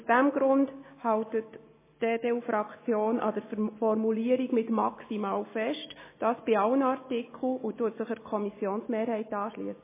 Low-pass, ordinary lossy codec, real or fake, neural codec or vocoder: 3.6 kHz; MP3, 16 kbps; real; none